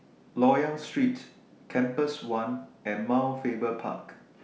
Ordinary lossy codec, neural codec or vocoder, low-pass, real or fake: none; none; none; real